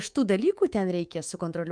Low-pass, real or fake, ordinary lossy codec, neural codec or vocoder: 9.9 kHz; fake; Opus, 32 kbps; codec, 24 kHz, 3.1 kbps, DualCodec